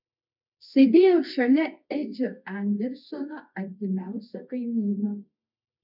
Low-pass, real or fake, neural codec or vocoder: 5.4 kHz; fake; codec, 24 kHz, 0.9 kbps, WavTokenizer, medium music audio release